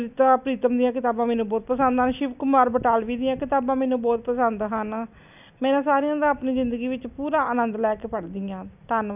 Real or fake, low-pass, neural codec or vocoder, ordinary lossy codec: real; 3.6 kHz; none; none